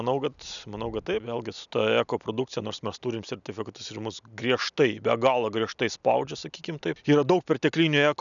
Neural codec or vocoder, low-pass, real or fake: none; 7.2 kHz; real